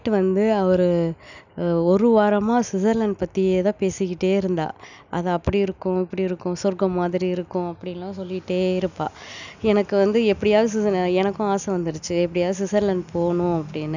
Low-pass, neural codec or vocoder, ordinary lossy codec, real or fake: 7.2 kHz; none; none; real